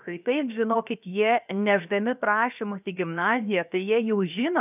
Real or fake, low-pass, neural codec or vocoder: fake; 3.6 kHz; codec, 16 kHz, 0.7 kbps, FocalCodec